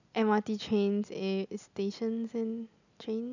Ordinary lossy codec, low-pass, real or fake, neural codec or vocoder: none; 7.2 kHz; real; none